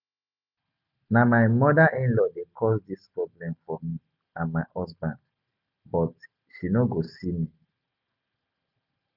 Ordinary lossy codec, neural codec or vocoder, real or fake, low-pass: none; none; real; 5.4 kHz